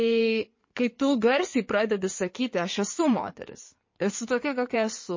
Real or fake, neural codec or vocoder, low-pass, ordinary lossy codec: fake; codec, 16 kHz in and 24 kHz out, 2.2 kbps, FireRedTTS-2 codec; 7.2 kHz; MP3, 32 kbps